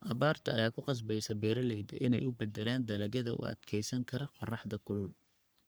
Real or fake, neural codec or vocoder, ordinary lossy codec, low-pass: fake; codec, 44.1 kHz, 3.4 kbps, Pupu-Codec; none; none